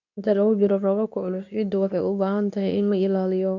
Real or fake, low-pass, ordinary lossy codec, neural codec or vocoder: fake; 7.2 kHz; none; codec, 24 kHz, 0.9 kbps, WavTokenizer, medium speech release version 2